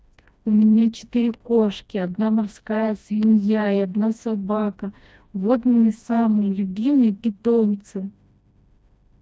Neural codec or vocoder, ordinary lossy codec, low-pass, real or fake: codec, 16 kHz, 1 kbps, FreqCodec, smaller model; none; none; fake